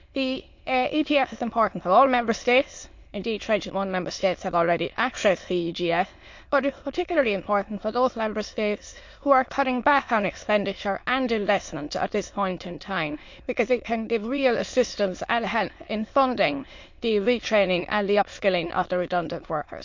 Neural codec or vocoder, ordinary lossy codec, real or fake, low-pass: autoencoder, 22.05 kHz, a latent of 192 numbers a frame, VITS, trained on many speakers; MP3, 48 kbps; fake; 7.2 kHz